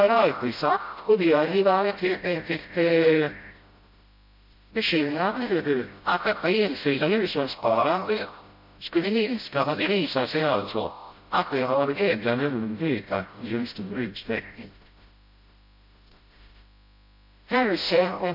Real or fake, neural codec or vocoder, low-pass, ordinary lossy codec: fake; codec, 16 kHz, 0.5 kbps, FreqCodec, smaller model; 5.4 kHz; MP3, 32 kbps